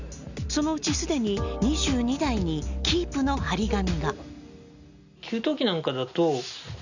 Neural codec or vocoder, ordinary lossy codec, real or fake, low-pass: none; none; real; 7.2 kHz